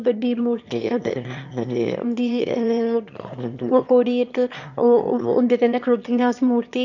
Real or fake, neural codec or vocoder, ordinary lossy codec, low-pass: fake; autoencoder, 22.05 kHz, a latent of 192 numbers a frame, VITS, trained on one speaker; none; 7.2 kHz